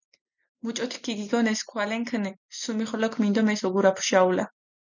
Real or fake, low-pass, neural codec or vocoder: real; 7.2 kHz; none